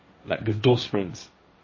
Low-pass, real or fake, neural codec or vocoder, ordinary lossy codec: 7.2 kHz; fake; codec, 16 kHz, 1.1 kbps, Voila-Tokenizer; MP3, 32 kbps